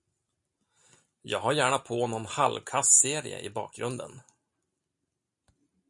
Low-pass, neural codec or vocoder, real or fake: 10.8 kHz; none; real